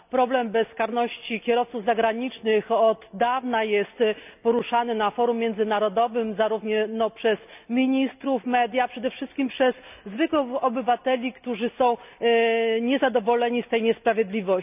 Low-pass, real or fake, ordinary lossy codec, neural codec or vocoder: 3.6 kHz; real; none; none